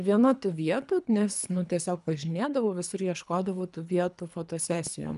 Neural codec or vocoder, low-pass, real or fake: codec, 24 kHz, 3 kbps, HILCodec; 10.8 kHz; fake